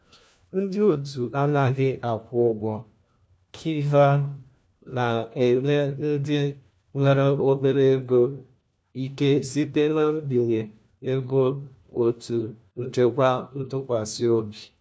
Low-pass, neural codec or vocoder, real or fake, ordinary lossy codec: none; codec, 16 kHz, 1 kbps, FunCodec, trained on LibriTTS, 50 frames a second; fake; none